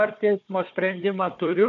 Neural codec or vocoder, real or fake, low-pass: codec, 16 kHz, 4 kbps, FunCodec, trained on Chinese and English, 50 frames a second; fake; 7.2 kHz